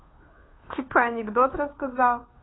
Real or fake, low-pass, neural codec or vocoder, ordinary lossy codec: fake; 7.2 kHz; codec, 16 kHz, 2 kbps, FunCodec, trained on Chinese and English, 25 frames a second; AAC, 16 kbps